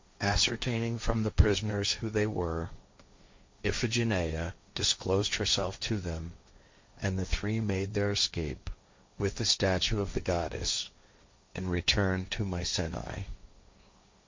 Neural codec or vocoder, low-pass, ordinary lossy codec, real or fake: codec, 16 kHz, 1.1 kbps, Voila-Tokenizer; 7.2 kHz; MP3, 48 kbps; fake